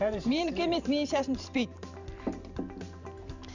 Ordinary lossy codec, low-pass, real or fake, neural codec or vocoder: none; 7.2 kHz; fake; vocoder, 44.1 kHz, 128 mel bands every 512 samples, BigVGAN v2